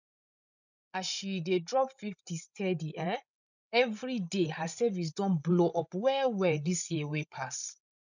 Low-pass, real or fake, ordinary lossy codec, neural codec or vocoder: 7.2 kHz; fake; none; codec, 16 kHz, 16 kbps, FreqCodec, larger model